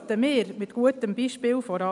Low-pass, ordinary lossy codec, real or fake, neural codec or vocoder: 10.8 kHz; none; real; none